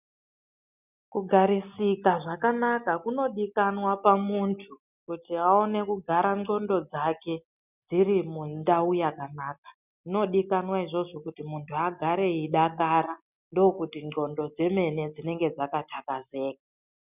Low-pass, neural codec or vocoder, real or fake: 3.6 kHz; none; real